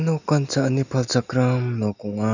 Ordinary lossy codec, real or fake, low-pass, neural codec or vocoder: none; real; 7.2 kHz; none